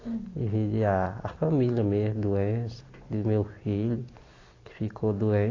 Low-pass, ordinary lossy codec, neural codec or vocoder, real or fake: 7.2 kHz; AAC, 32 kbps; none; real